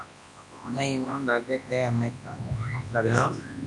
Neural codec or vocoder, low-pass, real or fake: codec, 24 kHz, 0.9 kbps, WavTokenizer, large speech release; 10.8 kHz; fake